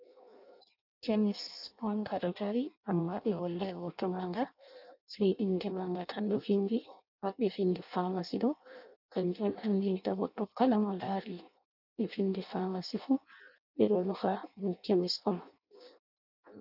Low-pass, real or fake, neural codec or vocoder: 5.4 kHz; fake; codec, 16 kHz in and 24 kHz out, 0.6 kbps, FireRedTTS-2 codec